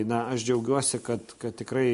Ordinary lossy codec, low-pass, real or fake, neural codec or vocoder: MP3, 48 kbps; 10.8 kHz; real; none